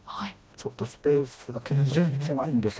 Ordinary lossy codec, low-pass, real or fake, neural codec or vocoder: none; none; fake; codec, 16 kHz, 1 kbps, FreqCodec, smaller model